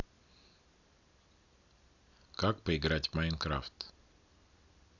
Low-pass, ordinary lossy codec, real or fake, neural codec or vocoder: 7.2 kHz; none; real; none